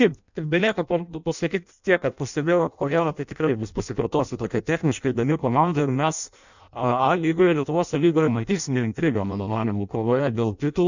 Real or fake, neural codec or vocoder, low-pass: fake; codec, 16 kHz in and 24 kHz out, 0.6 kbps, FireRedTTS-2 codec; 7.2 kHz